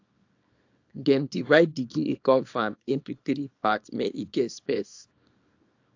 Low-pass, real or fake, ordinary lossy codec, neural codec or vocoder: 7.2 kHz; fake; AAC, 48 kbps; codec, 24 kHz, 0.9 kbps, WavTokenizer, small release